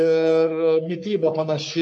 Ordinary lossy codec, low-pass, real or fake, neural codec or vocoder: MP3, 64 kbps; 10.8 kHz; fake; codec, 44.1 kHz, 3.4 kbps, Pupu-Codec